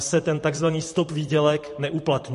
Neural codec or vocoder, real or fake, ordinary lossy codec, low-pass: vocoder, 44.1 kHz, 128 mel bands, Pupu-Vocoder; fake; MP3, 48 kbps; 14.4 kHz